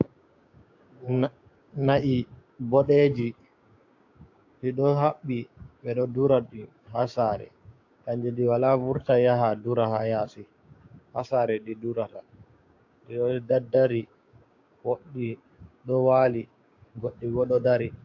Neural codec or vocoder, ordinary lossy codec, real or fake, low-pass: codec, 16 kHz, 6 kbps, DAC; AAC, 48 kbps; fake; 7.2 kHz